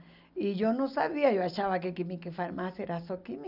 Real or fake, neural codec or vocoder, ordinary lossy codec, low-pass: real; none; none; 5.4 kHz